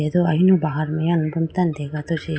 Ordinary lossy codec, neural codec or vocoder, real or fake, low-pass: none; none; real; none